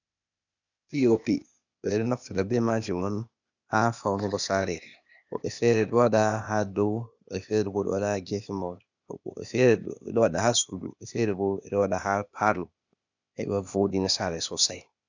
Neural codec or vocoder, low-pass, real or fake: codec, 16 kHz, 0.8 kbps, ZipCodec; 7.2 kHz; fake